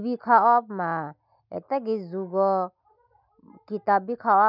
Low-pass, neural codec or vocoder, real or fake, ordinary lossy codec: 5.4 kHz; none; real; none